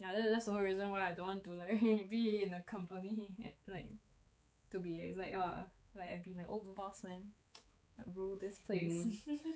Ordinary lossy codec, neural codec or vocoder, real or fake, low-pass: none; codec, 16 kHz, 4 kbps, X-Codec, HuBERT features, trained on balanced general audio; fake; none